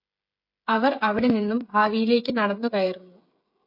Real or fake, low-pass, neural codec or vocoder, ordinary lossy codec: fake; 5.4 kHz; codec, 16 kHz, 16 kbps, FreqCodec, smaller model; MP3, 32 kbps